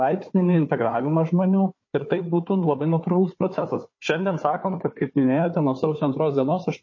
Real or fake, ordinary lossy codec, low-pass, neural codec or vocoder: fake; MP3, 32 kbps; 7.2 kHz; codec, 16 kHz, 4 kbps, FunCodec, trained on Chinese and English, 50 frames a second